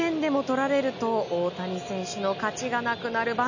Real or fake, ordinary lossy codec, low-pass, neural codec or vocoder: real; none; 7.2 kHz; none